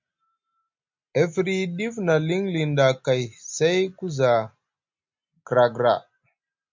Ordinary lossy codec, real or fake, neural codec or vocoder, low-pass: MP3, 64 kbps; real; none; 7.2 kHz